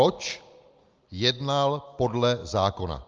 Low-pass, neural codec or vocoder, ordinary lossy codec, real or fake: 7.2 kHz; none; Opus, 24 kbps; real